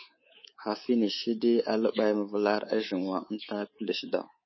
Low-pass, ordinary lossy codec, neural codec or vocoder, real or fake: 7.2 kHz; MP3, 24 kbps; codec, 24 kHz, 3.1 kbps, DualCodec; fake